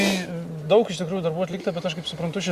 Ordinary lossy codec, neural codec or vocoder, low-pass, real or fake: Opus, 64 kbps; none; 14.4 kHz; real